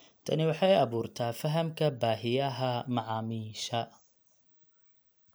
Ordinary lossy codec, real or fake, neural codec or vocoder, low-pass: none; real; none; none